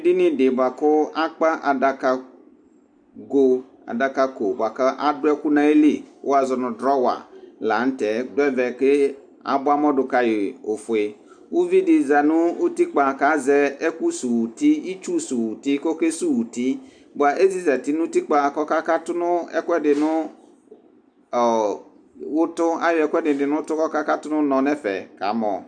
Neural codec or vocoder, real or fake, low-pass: none; real; 9.9 kHz